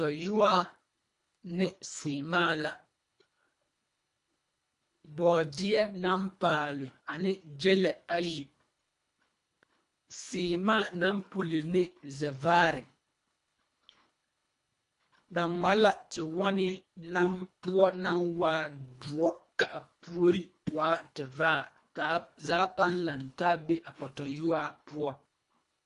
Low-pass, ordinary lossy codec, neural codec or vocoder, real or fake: 10.8 kHz; AAC, 64 kbps; codec, 24 kHz, 1.5 kbps, HILCodec; fake